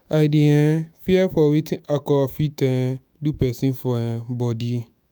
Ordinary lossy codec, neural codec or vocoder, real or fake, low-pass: none; autoencoder, 48 kHz, 128 numbers a frame, DAC-VAE, trained on Japanese speech; fake; none